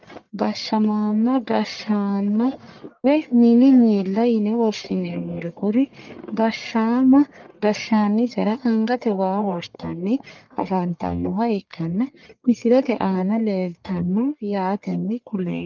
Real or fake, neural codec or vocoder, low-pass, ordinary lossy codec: fake; codec, 44.1 kHz, 1.7 kbps, Pupu-Codec; 7.2 kHz; Opus, 24 kbps